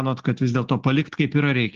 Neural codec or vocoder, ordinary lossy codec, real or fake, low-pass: none; Opus, 16 kbps; real; 7.2 kHz